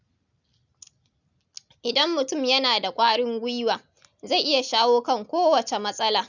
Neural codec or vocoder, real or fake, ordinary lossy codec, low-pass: none; real; none; 7.2 kHz